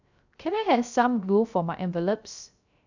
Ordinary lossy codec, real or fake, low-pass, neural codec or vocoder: none; fake; 7.2 kHz; codec, 16 kHz, 0.3 kbps, FocalCodec